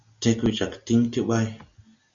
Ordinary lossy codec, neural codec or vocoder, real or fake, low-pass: Opus, 64 kbps; none; real; 7.2 kHz